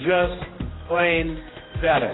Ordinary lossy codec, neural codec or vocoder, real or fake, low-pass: AAC, 16 kbps; codec, 16 kHz, 1 kbps, X-Codec, HuBERT features, trained on general audio; fake; 7.2 kHz